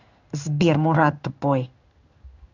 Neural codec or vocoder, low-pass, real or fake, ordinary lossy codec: codec, 16 kHz in and 24 kHz out, 1 kbps, XY-Tokenizer; 7.2 kHz; fake; none